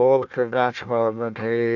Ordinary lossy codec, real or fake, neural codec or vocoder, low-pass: none; fake; codec, 16 kHz, 1 kbps, FunCodec, trained on Chinese and English, 50 frames a second; 7.2 kHz